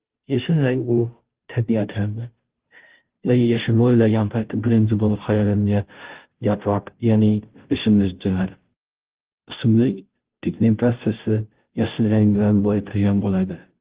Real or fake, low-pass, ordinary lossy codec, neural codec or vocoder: fake; 3.6 kHz; Opus, 16 kbps; codec, 16 kHz, 0.5 kbps, FunCodec, trained on Chinese and English, 25 frames a second